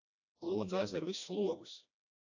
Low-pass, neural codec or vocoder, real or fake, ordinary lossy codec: 7.2 kHz; codec, 16 kHz, 1 kbps, FreqCodec, smaller model; fake; AAC, 48 kbps